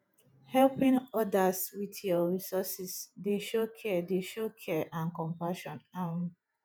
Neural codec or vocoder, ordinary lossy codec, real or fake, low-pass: vocoder, 48 kHz, 128 mel bands, Vocos; none; fake; none